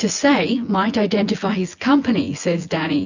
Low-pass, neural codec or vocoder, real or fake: 7.2 kHz; vocoder, 24 kHz, 100 mel bands, Vocos; fake